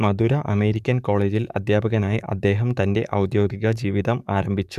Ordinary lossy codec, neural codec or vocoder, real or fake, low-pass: none; codec, 44.1 kHz, 7.8 kbps, DAC; fake; 14.4 kHz